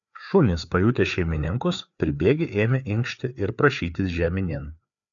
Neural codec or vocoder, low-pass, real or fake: codec, 16 kHz, 4 kbps, FreqCodec, larger model; 7.2 kHz; fake